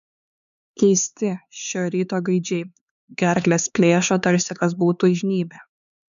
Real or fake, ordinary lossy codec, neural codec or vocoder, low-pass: fake; MP3, 96 kbps; codec, 16 kHz, 4 kbps, X-Codec, HuBERT features, trained on LibriSpeech; 7.2 kHz